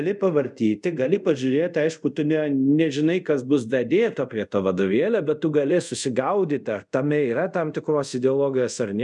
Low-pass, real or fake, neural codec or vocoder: 10.8 kHz; fake; codec, 24 kHz, 0.5 kbps, DualCodec